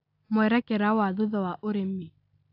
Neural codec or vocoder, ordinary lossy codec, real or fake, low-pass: none; AAC, 48 kbps; real; 5.4 kHz